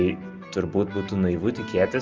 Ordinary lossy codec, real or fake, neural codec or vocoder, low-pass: Opus, 24 kbps; real; none; 7.2 kHz